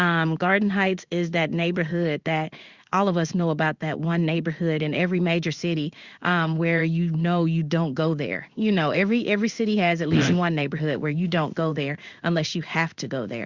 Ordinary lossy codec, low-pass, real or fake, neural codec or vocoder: Opus, 64 kbps; 7.2 kHz; fake; codec, 16 kHz in and 24 kHz out, 1 kbps, XY-Tokenizer